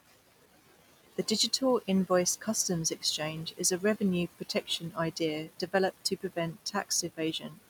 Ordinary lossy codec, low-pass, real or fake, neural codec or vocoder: none; none; real; none